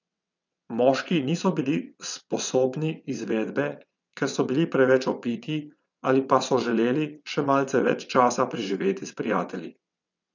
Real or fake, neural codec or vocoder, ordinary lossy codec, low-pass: fake; vocoder, 22.05 kHz, 80 mel bands, WaveNeXt; none; 7.2 kHz